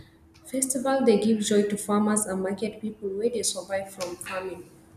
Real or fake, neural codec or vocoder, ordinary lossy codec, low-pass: real; none; none; 14.4 kHz